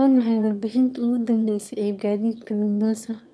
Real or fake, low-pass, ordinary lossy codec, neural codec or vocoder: fake; none; none; autoencoder, 22.05 kHz, a latent of 192 numbers a frame, VITS, trained on one speaker